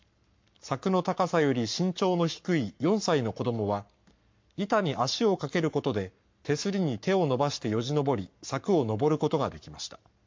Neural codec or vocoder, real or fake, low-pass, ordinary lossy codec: codec, 44.1 kHz, 7.8 kbps, Pupu-Codec; fake; 7.2 kHz; MP3, 48 kbps